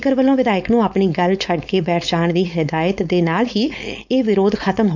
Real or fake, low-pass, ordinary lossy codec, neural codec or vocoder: fake; 7.2 kHz; none; codec, 16 kHz, 4.8 kbps, FACodec